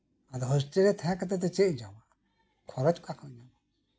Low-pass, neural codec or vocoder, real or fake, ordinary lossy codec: none; none; real; none